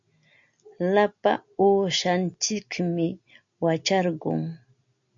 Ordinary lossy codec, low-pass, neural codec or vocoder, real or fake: MP3, 64 kbps; 7.2 kHz; none; real